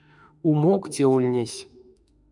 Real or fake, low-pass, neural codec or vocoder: fake; 10.8 kHz; autoencoder, 48 kHz, 32 numbers a frame, DAC-VAE, trained on Japanese speech